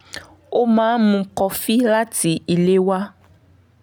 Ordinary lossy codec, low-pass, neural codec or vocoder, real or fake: none; none; none; real